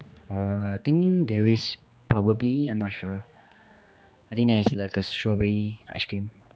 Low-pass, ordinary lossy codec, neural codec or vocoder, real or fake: none; none; codec, 16 kHz, 2 kbps, X-Codec, HuBERT features, trained on general audio; fake